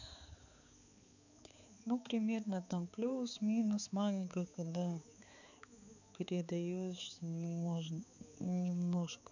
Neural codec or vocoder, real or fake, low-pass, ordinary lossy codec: codec, 16 kHz, 4 kbps, X-Codec, HuBERT features, trained on balanced general audio; fake; 7.2 kHz; none